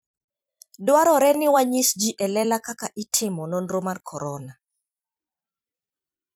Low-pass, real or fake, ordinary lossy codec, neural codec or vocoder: none; fake; none; vocoder, 44.1 kHz, 128 mel bands every 256 samples, BigVGAN v2